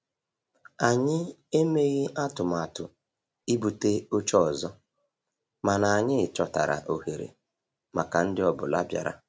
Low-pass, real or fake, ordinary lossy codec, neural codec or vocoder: none; real; none; none